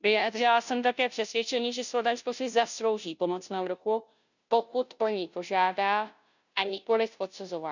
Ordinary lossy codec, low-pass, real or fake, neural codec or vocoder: none; 7.2 kHz; fake; codec, 16 kHz, 0.5 kbps, FunCodec, trained on Chinese and English, 25 frames a second